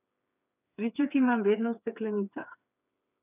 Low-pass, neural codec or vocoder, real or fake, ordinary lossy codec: 3.6 kHz; codec, 16 kHz, 4 kbps, FreqCodec, smaller model; fake; none